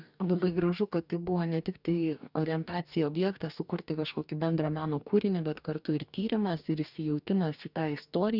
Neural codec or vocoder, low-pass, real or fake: codec, 44.1 kHz, 2.6 kbps, DAC; 5.4 kHz; fake